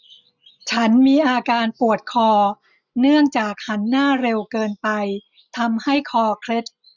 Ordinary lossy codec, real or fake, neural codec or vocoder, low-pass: none; real; none; 7.2 kHz